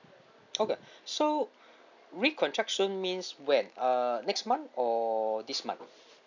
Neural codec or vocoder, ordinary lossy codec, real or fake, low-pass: none; none; real; 7.2 kHz